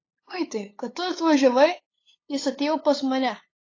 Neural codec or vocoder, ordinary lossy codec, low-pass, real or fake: codec, 16 kHz, 8 kbps, FunCodec, trained on LibriTTS, 25 frames a second; AAC, 32 kbps; 7.2 kHz; fake